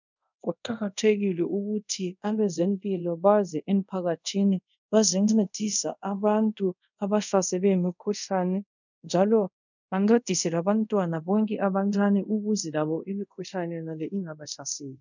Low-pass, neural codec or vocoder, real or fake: 7.2 kHz; codec, 24 kHz, 0.5 kbps, DualCodec; fake